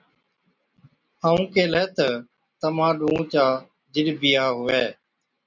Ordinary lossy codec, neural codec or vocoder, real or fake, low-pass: MP3, 64 kbps; none; real; 7.2 kHz